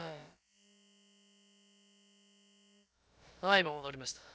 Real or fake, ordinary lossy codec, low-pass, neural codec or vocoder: fake; none; none; codec, 16 kHz, about 1 kbps, DyCAST, with the encoder's durations